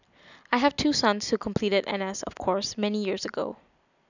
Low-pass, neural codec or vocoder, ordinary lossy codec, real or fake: 7.2 kHz; none; none; real